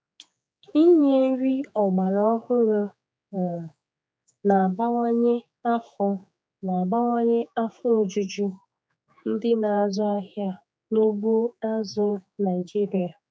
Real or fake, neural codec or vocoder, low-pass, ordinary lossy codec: fake; codec, 16 kHz, 4 kbps, X-Codec, HuBERT features, trained on general audio; none; none